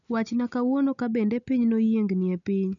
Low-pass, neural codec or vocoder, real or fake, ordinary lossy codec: 7.2 kHz; none; real; none